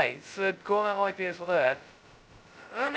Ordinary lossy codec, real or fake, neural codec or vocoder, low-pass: none; fake; codec, 16 kHz, 0.2 kbps, FocalCodec; none